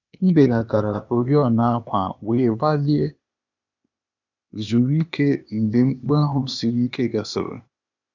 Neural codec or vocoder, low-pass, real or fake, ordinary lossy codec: codec, 16 kHz, 0.8 kbps, ZipCodec; 7.2 kHz; fake; none